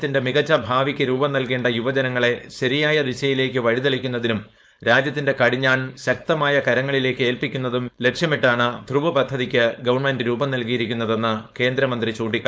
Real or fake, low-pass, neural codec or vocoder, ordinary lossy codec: fake; none; codec, 16 kHz, 4.8 kbps, FACodec; none